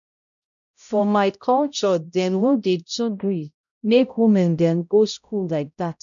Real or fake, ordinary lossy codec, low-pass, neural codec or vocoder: fake; none; 7.2 kHz; codec, 16 kHz, 0.5 kbps, X-Codec, HuBERT features, trained on balanced general audio